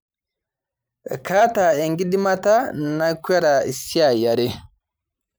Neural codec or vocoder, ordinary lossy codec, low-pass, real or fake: vocoder, 44.1 kHz, 128 mel bands every 512 samples, BigVGAN v2; none; none; fake